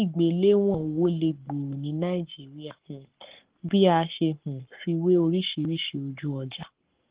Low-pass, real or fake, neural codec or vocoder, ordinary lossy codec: 3.6 kHz; fake; codec, 44.1 kHz, 7.8 kbps, Pupu-Codec; Opus, 16 kbps